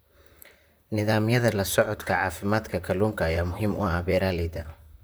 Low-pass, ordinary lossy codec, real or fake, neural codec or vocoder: none; none; fake; vocoder, 44.1 kHz, 128 mel bands, Pupu-Vocoder